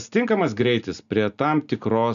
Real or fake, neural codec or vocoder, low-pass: real; none; 7.2 kHz